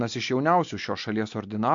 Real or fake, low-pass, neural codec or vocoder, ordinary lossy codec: real; 7.2 kHz; none; MP3, 48 kbps